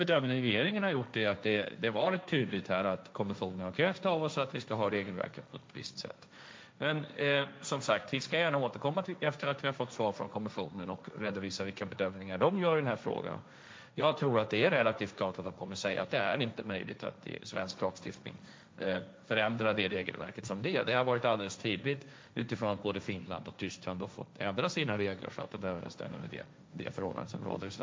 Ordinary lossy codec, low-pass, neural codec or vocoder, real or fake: none; none; codec, 16 kHz, 1.1 kbps, Voila-Tokenizer; fake